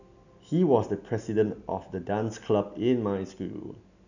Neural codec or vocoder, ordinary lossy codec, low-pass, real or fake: none; none; 7.2 kHz; real